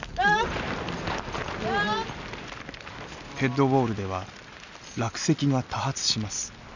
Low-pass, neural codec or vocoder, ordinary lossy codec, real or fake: 7.2 kHz; none; none; real